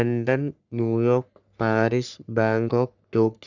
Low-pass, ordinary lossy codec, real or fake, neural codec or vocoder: 7.2 kHz; none; fake; codec, 44.1 kHz, 3.4 kbps, Pupu-Codec